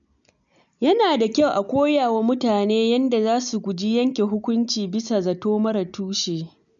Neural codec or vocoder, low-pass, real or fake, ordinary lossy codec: none; 7.2 kHz; real; none